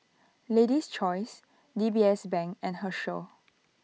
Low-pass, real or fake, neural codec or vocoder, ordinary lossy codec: none; real; none; none